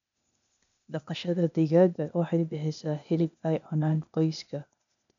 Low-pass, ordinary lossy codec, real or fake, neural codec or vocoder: 7.2 kHz; none; fake; codec, 16 kHz, 0.8 kbps, ZipCodec